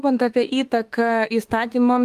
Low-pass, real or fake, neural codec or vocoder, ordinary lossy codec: 14.4 kHz; fake; autoencoder, 48 kHz, 32 numbers a frame, DAC-VAE, trained on Japanese speech; Opus, 24 kbps